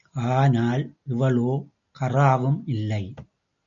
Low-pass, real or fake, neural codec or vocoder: 7.2 kHz; real; none